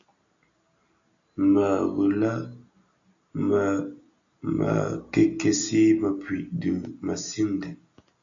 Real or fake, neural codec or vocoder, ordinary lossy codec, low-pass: real; none; MP3, 64 kbps; 7.2 kHz